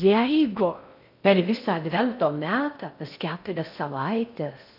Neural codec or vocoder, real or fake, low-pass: codec, 16 kHz in and 24 kHz out, 0.6 kbps, FocalCodec, streaming, 2048 codes; fake; 5.4 kHz